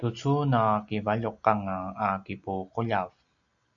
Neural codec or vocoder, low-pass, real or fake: none; 7.2 kHz; real